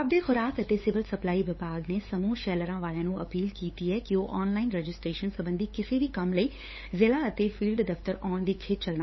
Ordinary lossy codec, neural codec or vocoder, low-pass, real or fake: MP3, 24 kbps; codec, 16 kHz, 8 kbps, FunCodec, trained on LibriTTS, 25 frames a second; 7.2 kHz; fake